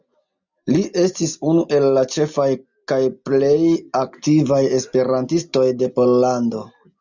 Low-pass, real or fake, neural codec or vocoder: 7.2 kHz; real; none